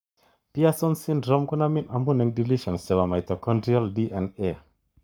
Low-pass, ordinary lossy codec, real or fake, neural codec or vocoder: none; none; fake; codec, 44.1 kHz, 7.8 kbps, Pupu-Codec